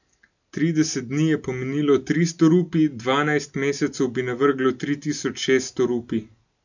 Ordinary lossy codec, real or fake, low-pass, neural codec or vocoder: none; real; 7.2 kHz; none